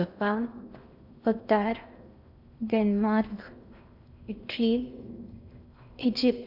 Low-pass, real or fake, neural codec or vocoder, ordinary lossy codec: 5.4 kHz; fake; codec, 16 kHz in and 24 kHz out, 0.6 kbps, FocalCodec, streaming, 2048 codes; none